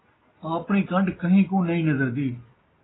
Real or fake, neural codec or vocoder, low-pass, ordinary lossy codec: real; none; 7.2 kHz; AAC, 16 kbps